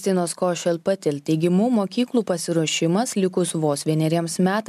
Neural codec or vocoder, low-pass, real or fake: none; 14.4 kHz; real